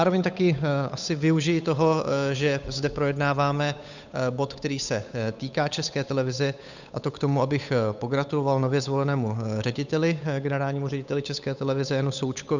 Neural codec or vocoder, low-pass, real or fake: codec, 16 kHz, 8 kbps, FunCodec, trained on Chinese and English, 25 frames a second; 7.2 kHz; fake